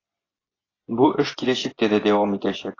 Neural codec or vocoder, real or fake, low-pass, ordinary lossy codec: vocoder, 24 kHz, 100 mel bands, Vocos; fake; 7.2 kHz; AAC, 32 kbps